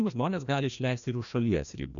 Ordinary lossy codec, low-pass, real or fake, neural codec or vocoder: MP3, 96 kbps; 7.2 kHz; fake; codec, 16 kHz, 1 kbps, FreqCodec, larger model